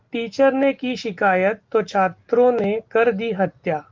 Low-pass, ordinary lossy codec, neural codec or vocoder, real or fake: 7.2 kHz; Opus, 32 kbps; none; real